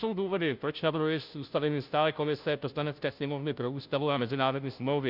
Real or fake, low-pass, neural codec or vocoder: fake; 5.4 kHz; codec, 16 kHz, 0.5 kbps, FunCodec, trained on Chinese and English, 25 frames a second